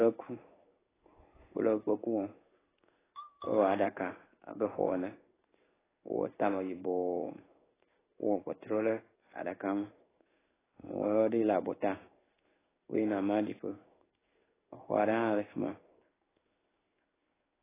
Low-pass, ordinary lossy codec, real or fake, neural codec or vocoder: 3.6 kHz; AAC, 16 kbps; fake; codec, 16 kHz in and 24 kHz out, 1 kbps, XY-Tokenizer